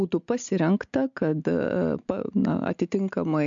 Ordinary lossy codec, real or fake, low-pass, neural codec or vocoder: MP3, 64 kbps; real; 7.2 kHz; none